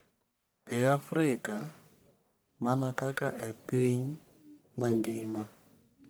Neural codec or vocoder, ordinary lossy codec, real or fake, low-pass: codec, 44.1 kHz, 1.7 kbps, Pupu-Codec; none; fake; none